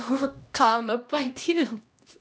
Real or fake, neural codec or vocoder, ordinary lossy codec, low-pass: fake; codec, 16 kHz, 1 kbps, X-Codec, HuBERT features, trained on LibriSpeech; none; none